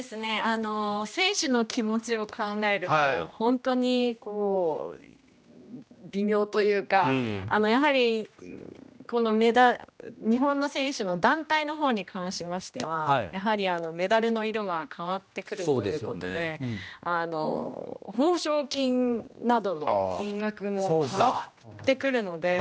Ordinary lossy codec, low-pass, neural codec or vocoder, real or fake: none; none; codec, 16 kHz, 1 kbps, X-Codec, HuBERT features, trained on general audio; fake